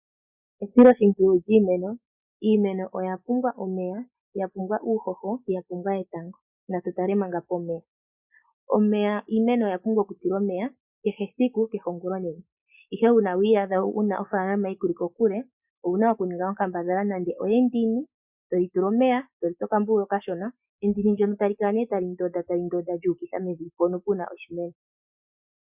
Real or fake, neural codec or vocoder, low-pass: real; none; 3.6 kHz